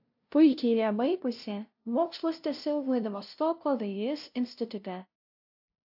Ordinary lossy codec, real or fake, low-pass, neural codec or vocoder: AAC, 48 kbps; fake; 5.4 kHz; codec, 16 kHz, 0.5 kbps, FunCodec, trained on LibriTTS, 25 frames a second